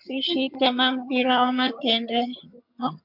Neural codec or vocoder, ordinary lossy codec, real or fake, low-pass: vocoder, 22.05 kHz, 80 mel bands, HiFi-GAN; MP3, 48 kbps; fake; 5.4 kHz